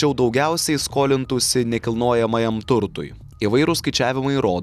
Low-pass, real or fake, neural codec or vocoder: 14.4 kHz; real; none